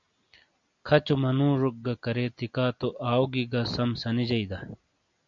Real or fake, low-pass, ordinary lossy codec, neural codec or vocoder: real; 7.2 kHz; MP3, 64 kbps; none